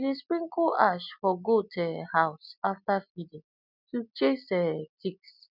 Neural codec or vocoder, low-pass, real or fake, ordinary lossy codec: none; 5.4 kHz; real; none